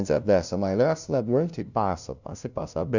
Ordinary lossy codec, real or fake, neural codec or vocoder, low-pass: none; fake; codec, 16 kHz, 0.5 kbps, FunCodec, trained on LibriTTS, 25 frames a second; 7.2 kHz